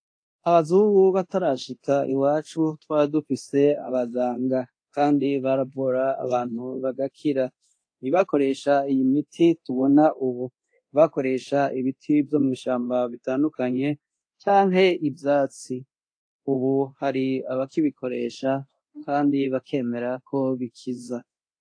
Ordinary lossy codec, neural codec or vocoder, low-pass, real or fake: AAC, 48 kbps; codec, 24 kHz, 0.9 kbps, DualCodec; 9.9 kHz; fake